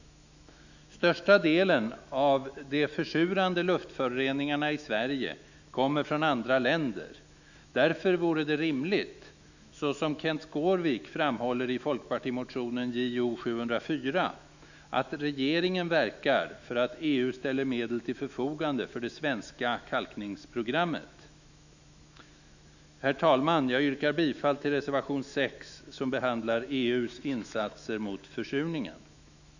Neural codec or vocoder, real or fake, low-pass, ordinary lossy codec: autoencoder, 48 kHz, 128 numbers a frame, DAC-VAE, trained on Japanese speech; fake; 7.2 kHz; none